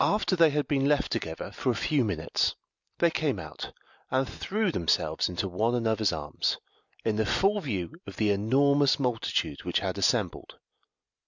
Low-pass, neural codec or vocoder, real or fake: 7.2 kHz; none; real